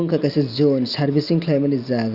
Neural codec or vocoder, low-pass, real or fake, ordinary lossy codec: none; 5.4 kHz; real; Opus, 64 kbps